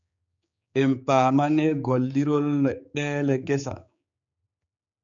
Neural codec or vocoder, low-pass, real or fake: codec, 16 kHz, 4 kbps, X-Codec, HuBERT features, trained on general audio; 7.2 kHz; fake